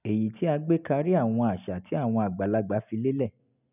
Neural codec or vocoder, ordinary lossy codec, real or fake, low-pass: none; none; real; 3.6 kHz